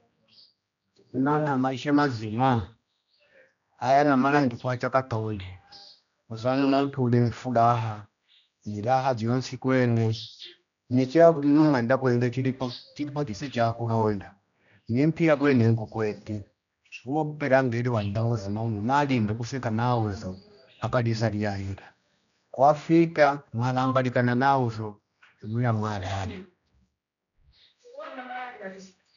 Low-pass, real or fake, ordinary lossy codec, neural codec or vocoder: 7.2 kHz; fake; none; codec, 16 kHz, 1 kbps, X-Codec, HuBERT features, trained on general audio